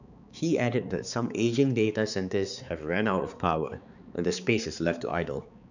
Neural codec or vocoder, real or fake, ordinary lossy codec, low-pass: codec, 16 kHz, 4 kbps, X-Codec, HuBERT features, trained on balanced general audio; fake; none; 7.2 kHz